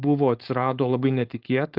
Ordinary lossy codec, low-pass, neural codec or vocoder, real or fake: Opus, 32 kbps; 5.4 kHz; codec, 16 kHz, 4.8 kbps, FACodec; fake